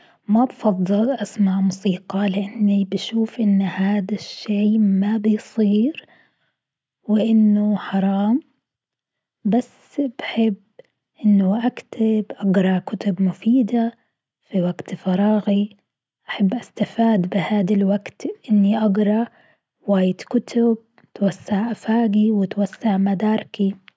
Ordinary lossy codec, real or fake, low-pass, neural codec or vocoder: none; real; none; none